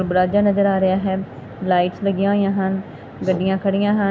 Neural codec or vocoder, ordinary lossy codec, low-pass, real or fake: none; none; none; real